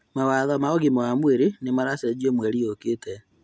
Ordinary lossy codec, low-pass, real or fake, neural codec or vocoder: none; none; real; none